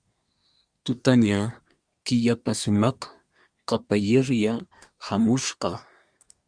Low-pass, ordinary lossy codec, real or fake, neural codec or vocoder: 9.9 kHz; Opus, 64 kbps; fake; codec, 24 kHz, 1 kbps, SNAC